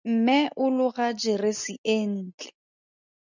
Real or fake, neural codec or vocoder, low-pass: real; none; 7.2 kHz